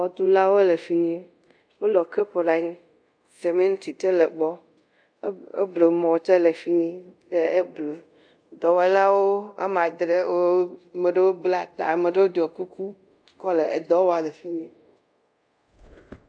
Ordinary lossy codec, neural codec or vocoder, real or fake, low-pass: AAC, 64 kbps; codec, 24 kHz, 0.5 kbps, DualCodec; fake; 9.9 kHz